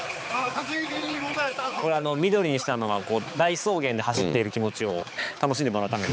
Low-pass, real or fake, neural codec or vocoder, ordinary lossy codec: none; fake; codec, 16 kHz, 4 kbps, X-Codec, HuBERT features, trained on balanced general audio; none